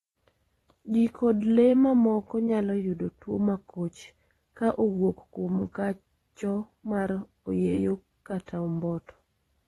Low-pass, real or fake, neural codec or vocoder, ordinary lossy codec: 19.8 kHz; fake; vocoder, 44.1 kHz, 128 mel bands every 512 samples, BigVGAN v2; AAC, 32 kbps